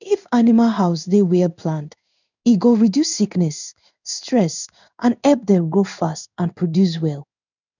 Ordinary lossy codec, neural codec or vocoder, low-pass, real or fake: none; codec, 16 kHz in and 24 kHz out, 1 kbps, XY-Tokenizer; 7.2 kHz; fake